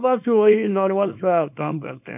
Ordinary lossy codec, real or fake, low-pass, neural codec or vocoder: none; fake; 3.6 kHz; codec, 24 kHz, 0.9 kbps, WavTokenizer, small release